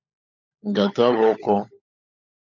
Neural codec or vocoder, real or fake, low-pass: codec, 16 kHz, 16 kbps, FunCodec, trained on LibriTTS, 50 frames a second; fake; 7.2 kHz